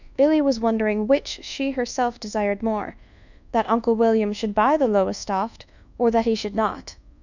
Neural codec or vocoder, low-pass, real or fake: codec, 24 kHz, 1.2 kbps, DualCodec; 7.2 kHz; fake